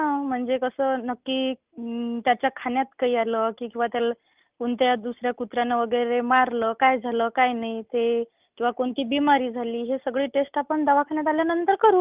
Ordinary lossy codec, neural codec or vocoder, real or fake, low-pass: Opus, 24 kbps; none; real; 3.6 kHz